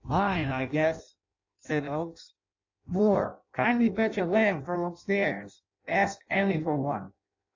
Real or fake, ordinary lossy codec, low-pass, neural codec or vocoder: fake; AAC, 48 kbps; 7.2 kHz; codec, 16 kHz in and 24 kHz out, 0.6 kbps, FireRedTTS-2 codec